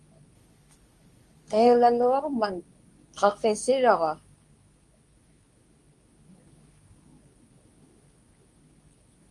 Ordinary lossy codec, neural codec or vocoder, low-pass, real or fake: Opus, 32 kbps; codec, 24 kHz, 0.9 kbps, WavTokenizer, medium speech release version 2; 10.8 kHz; fake